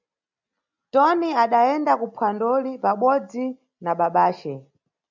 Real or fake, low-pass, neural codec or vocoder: real; 7.2 kHz; none